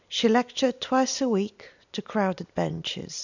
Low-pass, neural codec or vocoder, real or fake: 7.2 kHz; none; real